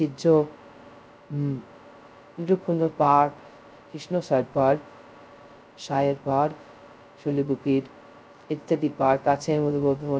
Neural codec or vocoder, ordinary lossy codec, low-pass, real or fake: codec, 16 kHz, 0.2 kbps, FocalCodec; none; none; fake